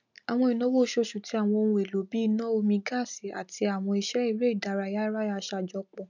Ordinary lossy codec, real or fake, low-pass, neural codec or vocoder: none; real; 7.2 kHz; none